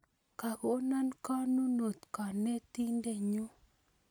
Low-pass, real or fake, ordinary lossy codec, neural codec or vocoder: none; real; none; none